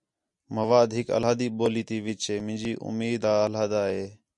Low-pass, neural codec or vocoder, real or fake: 10.8 kHz; none; real